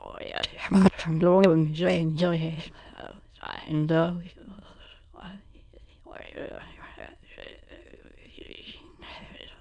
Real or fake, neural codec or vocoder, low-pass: fake; autoencoder, 22.05 kHz, a latent of 192 numbers a frame, VITS, trained on many speakers; 9.9 kHz